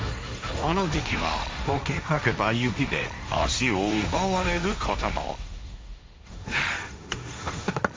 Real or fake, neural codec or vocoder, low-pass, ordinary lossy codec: fake; codec, 16 kHz, 1.1 kbps, Voila-Tokenizer; none; none